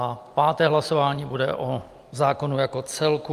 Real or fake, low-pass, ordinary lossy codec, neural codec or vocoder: real; 14.4 kHz; Opus, 32 kbps; none